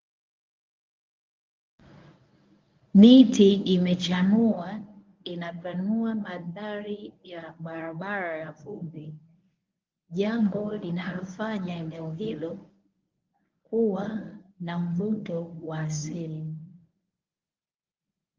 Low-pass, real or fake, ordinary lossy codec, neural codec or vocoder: 7.2 kHz; fake; Opus, 32 kbps; codec, 24 kHz, 0.9 kbps, WavTokenizer, medium speech release version 1